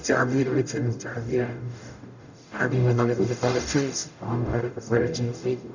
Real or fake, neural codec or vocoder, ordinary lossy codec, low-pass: fake; codec, 44.1 kHz, 0.9 kbps, DAC; none; 7.2 kHz